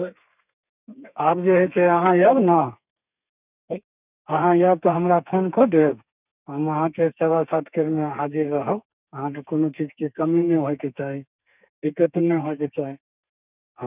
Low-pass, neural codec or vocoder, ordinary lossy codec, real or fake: 3.6 kHz; codec, 32 kHz, 1.9 kbps, SNAC; none; fake